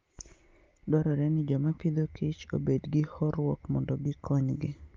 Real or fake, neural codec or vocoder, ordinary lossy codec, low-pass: real; none; Opus, 32 kbps; 7.2 kHz